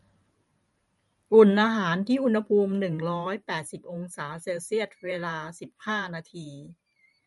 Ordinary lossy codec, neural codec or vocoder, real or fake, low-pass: MP3, 48 kbps; vocoder, 44.1 kHz, 128 mel bands every 512 samples, BigVGAN v2; fake; 19.8 kHz